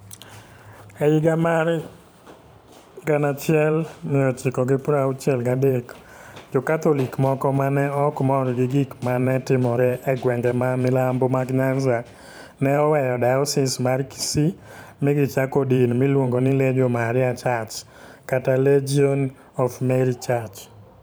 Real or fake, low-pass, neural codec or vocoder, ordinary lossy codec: fake; none; vocoder, 44.1 kHz, 128 mel bands every 512 samples, BigVGAN v2; none